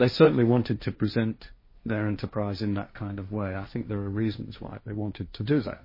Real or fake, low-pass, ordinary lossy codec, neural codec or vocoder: fake; 5.4 kHz; MP3, 24 kbps; codec, 16 kHz, 1.1 kbps, Voila-Tokenizer